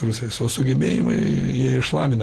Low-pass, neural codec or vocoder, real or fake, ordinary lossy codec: 14.4 kHz; vocoder, 48 kHz, 128 mel bands, Vocos; fake; Opus, 16 kbps